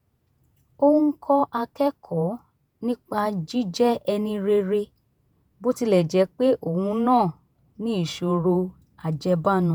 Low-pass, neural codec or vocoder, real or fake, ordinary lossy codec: none; vocoder, 48 kHz, 128 mel bands, Vocos; fake; none